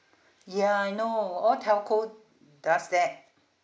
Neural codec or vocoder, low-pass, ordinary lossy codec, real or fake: none; none; none; real